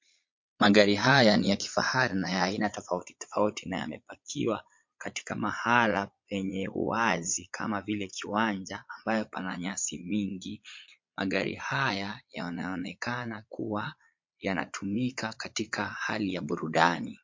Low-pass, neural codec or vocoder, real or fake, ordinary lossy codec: 7.2 kHz; vocoder, 22.05 kHz, 80 mel bands, Vocos; fake; MP3, 48 kbps